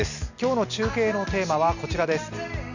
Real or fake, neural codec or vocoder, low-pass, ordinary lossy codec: real; none; 7.2 kHz; none